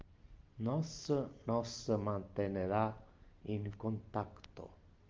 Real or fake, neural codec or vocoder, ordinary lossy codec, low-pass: real; none; Opus, 32 kbps; 7.2 kHz